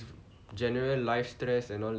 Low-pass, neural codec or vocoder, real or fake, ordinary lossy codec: none; none; real; none